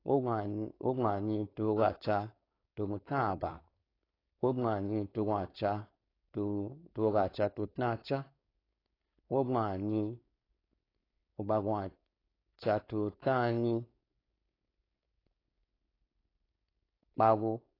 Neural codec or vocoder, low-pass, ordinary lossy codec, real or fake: codec, 16 kHz, 4.8 kbps, FACodec; 5.4 kHz; AAC, 24 kbps; fake